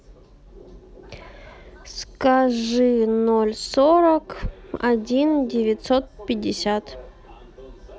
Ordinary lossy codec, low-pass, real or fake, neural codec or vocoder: none; none; real; none